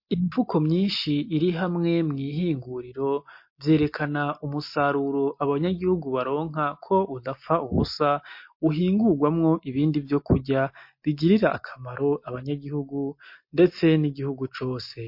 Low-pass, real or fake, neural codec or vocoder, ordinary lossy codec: 5.4 kHz; real; none; MP3, 32 kbps